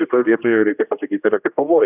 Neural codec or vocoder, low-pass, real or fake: codec, 16 kHz, 1 kbps, X-Codec, HuBERT features, trained on general audio; 3.6 kHz; fake